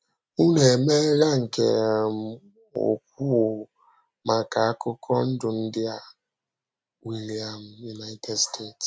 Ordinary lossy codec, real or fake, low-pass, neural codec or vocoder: none; real; none; none